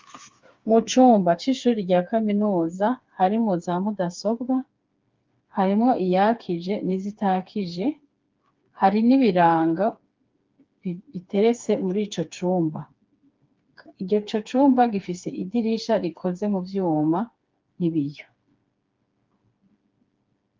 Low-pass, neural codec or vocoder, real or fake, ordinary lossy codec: 7.2 kHz; codec, 16 kHz, 4 kbps, FreqCodec, smaller model; fake; Opus, 32 kbps